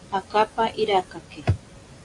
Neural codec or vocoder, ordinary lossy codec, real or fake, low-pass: none; AAC, 48 kbps; real; 10.8 kHz